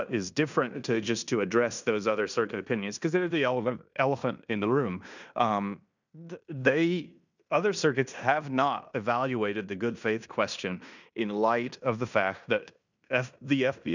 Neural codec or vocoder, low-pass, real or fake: codec, 16 kHz in and 24 kHz out, 0.9 kbps, LongCat-Audio-Codec, fine tuned four codebook decoder; 7.2 kHz; fake